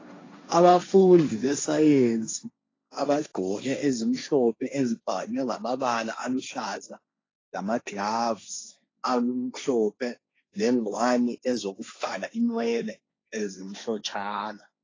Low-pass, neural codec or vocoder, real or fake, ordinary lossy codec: 7.2 kHz; codec, 16 kHz, 1.1 kbps, Voila-Tokenizer; fake; AAC, 32 kbps